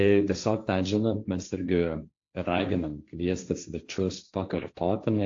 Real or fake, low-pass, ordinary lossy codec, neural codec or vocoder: fake; 7.2 kHz; AAC, 48 kbps; codec, 16 kHz, 1.1 kbps, Voila-Tokenizer